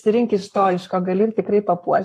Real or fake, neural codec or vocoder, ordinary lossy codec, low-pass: fake; codec, 44.1 kHz, 7.8 kbps, Pupu-Codec; AAC, 48 kbps; 14.4 kHz